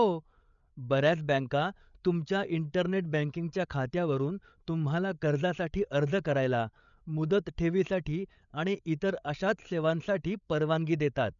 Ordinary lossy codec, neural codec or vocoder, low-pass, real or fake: none; codec, 16 kHz, 8 kbps, FreqCodec, larger model; 7.2 kHz; fake